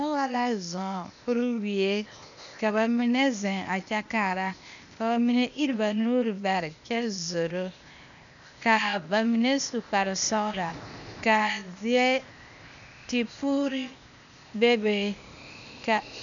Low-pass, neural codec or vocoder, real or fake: 7.2 kHz; codec, 16 kHz, 0.8 kbps, ZipCodec; fake